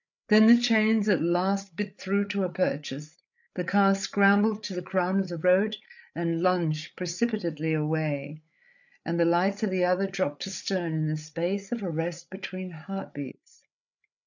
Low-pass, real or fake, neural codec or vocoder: 7.2 kHz; fake; codec, 16 kHz, 8 kbps, FreqCodec, larger model